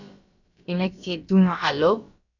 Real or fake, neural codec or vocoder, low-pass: fake; codec, 16 kHz, about 1 kbps, DyCAST, with the encoder's durations; 7.2 kHz